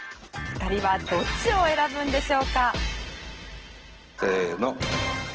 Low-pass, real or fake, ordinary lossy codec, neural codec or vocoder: 7.2 kHz; real; Opus, 16 kbps; none